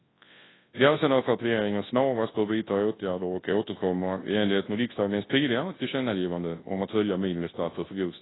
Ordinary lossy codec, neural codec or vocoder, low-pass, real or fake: AAC, 16 kbps; codec, 24 kHz, 0.9 kbps, WavTokenizer, large speech release; 7.2 kHz; fake